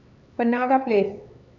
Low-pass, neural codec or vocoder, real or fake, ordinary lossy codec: 7.2 kHz; codec, 16 kHz, 4 kbps, X-Codec, HuBERT features, trained on LibriSpeech; fake; none